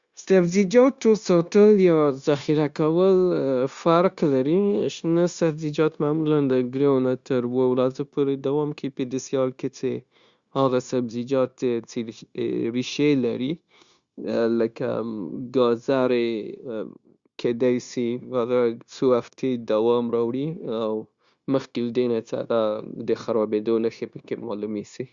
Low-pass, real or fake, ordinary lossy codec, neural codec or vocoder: 7.2 kHz; fake; Opus, 64 kbps; codec, 16 kHz, 0.9 kbps, LongCat-Audio-Codec